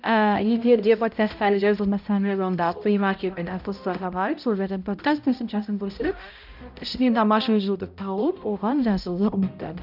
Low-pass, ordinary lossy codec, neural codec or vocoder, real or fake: 5.4 kHz; none; codec, 16 kHz, 0.5 kbps, X-Codec, HuBERT features, trained on balanced general audio; fake